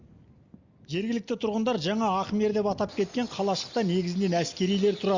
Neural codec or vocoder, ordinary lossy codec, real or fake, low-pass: none; Opus, 64 kbps; real; 7.2 kHz